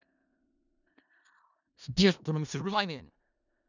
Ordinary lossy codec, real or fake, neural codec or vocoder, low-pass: none; fake; codec, 16 kHz in and 24 kHz out, 0.4 kbps, LongCat-Audio-Codec, four codebook decoder; 7.2 kHz